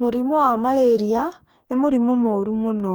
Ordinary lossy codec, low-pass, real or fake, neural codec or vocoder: none; none; fake; codec, 44.1 kHz, 2.6 kbps, DAC